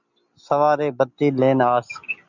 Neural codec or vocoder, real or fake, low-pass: none; real; 7.2 kHz